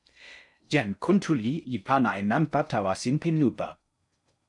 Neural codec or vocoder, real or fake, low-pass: codec, 16 kHz in and 24 kHz out, 0.6 kbps, FocalCodec, streaming, 4096 codes; fake; 10.8 kHz